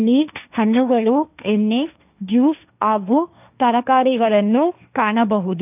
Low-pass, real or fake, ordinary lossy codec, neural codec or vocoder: 3.6 kHz; fake; none; codec, 16 kHz, 1.1 kbps, Voila-Tokenizer